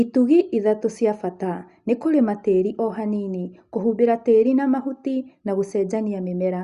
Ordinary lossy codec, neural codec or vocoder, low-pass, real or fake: Opus, 64 kbps; none; 10.8 kHz; real